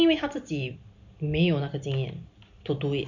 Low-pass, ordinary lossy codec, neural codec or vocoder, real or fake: 7.2 kHz; none; none; real